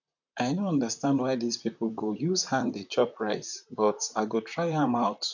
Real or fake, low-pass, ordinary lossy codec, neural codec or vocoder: fake; 7.2 kHz; none; vocoder, 44.1 kHz, 128 mel bands, Pupu-Vocoder